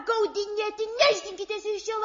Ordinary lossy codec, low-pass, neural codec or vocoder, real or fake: MP3, 32 kbps; 7.2 kHz; none; real